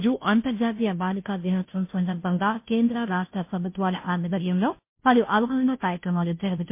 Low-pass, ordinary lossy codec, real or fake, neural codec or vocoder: 3.6 kHz; MP3, 24 kbps; fake; codec, 16 kHz, 0.5 kbps, FunCodec, trained on Chinese and English, 25 frames a second